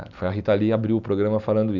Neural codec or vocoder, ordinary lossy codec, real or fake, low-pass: none; none; real; 7.2 kHz